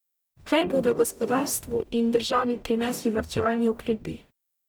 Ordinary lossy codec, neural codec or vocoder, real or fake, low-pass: none; codec, 44.1 kHz, 0.9 kbps, DAC; fake; none